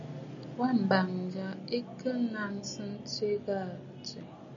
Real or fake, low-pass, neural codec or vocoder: real; 7.2 kHz; none